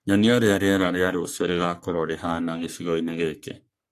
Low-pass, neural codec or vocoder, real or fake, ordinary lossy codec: 14.4 kHz; codec, 44.1 kHz, 3.4 kbps, Pupu-Codec; fake; AAC, 64 kbps